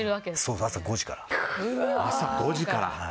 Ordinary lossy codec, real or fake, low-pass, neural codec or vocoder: none; real; none; none